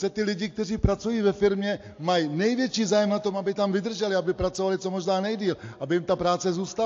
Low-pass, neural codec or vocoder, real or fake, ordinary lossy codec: 7.2 kHz; none; real; AAC, 48 kbps